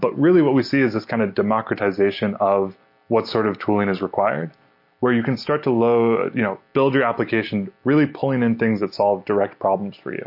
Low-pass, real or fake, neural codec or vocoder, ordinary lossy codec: 5.4 kHz; real; none; MP3, 32 kbps